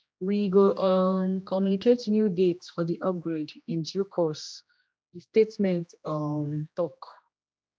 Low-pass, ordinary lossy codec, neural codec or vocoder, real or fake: none; none; codec, 16 kHz, 1 kbps, X-Codec, HuBERT features, trained on general audio; fake